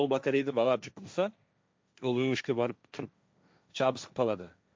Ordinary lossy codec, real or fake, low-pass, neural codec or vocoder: none; fake; none; codec, 16 kHz, 1.1 kbps, Voila-Tokenizer